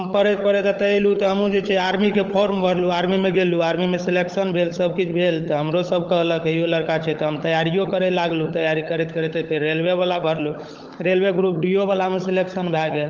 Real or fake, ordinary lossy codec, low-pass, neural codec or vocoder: fake; Opus, 24 kbps; 7.2 kHz; codec, 16 kHz, 16 kbps, FunCodec, trained on LibriTTS, 50 frames a second